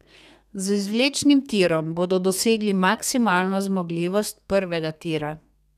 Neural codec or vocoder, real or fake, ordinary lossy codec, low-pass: codec, 32 kHz, 1.9 kbps, SNAC; fake; none; 14.4 kHz